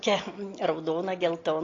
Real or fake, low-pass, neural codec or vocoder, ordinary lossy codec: real; 7.2 kHz; none; AAC, 32 kbps